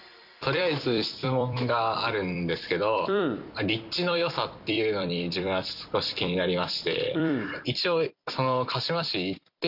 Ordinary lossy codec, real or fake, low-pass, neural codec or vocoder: none; fake; 5.4 kHz; vocoder, 44.1 kHz, 128 mel bands every 256 samples, BigVGAN v2